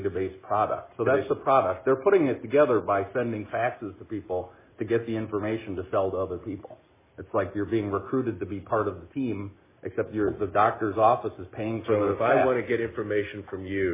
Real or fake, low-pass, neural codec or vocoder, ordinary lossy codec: real; 3.6 kHz; none; MP3, 16 kbps